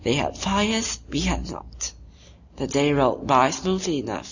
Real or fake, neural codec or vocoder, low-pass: real; none; 7.2 kHz